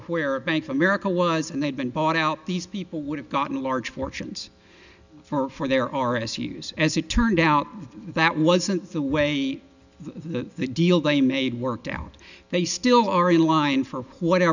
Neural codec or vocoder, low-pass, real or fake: none; 7.2 kHz; real